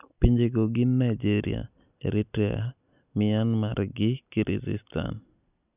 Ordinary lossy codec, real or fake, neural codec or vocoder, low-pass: none; real; none; 3.6 kHz